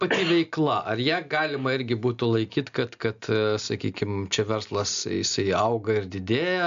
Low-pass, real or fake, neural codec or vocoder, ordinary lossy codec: 7.2 kHz; real; none; MP3, 64 kbps